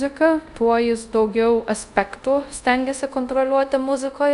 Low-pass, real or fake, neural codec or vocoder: 10.8 kHz; fake; codec, 24 kHz, 0.5 kbps, DualCodec